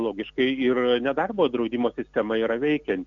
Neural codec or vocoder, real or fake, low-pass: none; real; 7.2 kHz